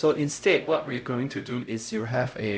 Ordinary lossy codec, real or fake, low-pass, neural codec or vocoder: none; fake; none; codec, 16 kHz, 0.5 kbps, X-Codec, HuBERT features, trained on LibriSpeech